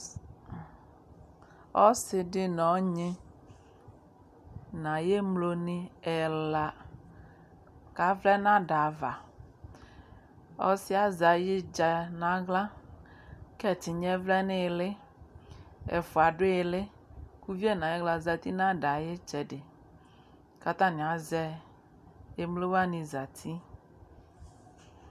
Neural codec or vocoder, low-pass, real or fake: none; 14.4 kHz; real